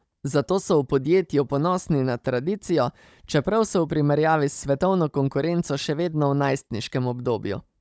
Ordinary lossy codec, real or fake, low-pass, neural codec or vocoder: none; fake; none; codec, 16 kHz, 16 kbps, FunCodec, trained on Chinese and English, 50 frames a second